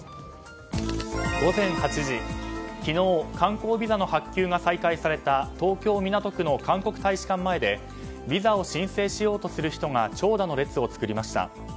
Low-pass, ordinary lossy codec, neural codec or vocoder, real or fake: none; none; none; real